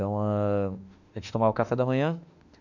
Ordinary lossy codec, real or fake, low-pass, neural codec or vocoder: none; fake; 7.2 kHz; autoencoder, 48 kHz, 32 numbers a frame, DAC-VAE, trained on Japanese speech